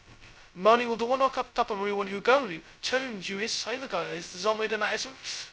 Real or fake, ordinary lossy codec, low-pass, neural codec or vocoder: fake; none; none; codec, 16 kHz, 0.2 kbps, FocalCodec